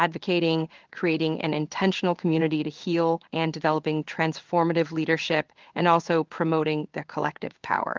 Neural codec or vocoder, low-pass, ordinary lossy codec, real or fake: codec, 16 kHz in and 24 kHz out, 1 kbps, XY-Tokenizer; 7.2 kHz; Opus, 32 kbps; fake